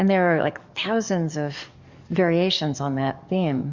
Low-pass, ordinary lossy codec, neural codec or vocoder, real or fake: 7.2 kHz; Opus, 64 kbps; codec, 44.1 kHz, 7.8 kbps, DAC; fake